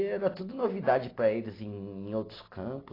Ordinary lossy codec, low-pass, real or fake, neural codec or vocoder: AAC, 24 kbps; 5.4 kHz; real; none